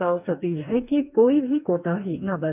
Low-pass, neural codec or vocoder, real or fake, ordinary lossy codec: 3.6 kHz; codec, 44.1 kHz, 2.6 kbps, DAC; fake; none